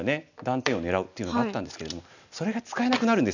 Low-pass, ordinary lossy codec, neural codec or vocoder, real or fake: 7.2 kHz; none; none; real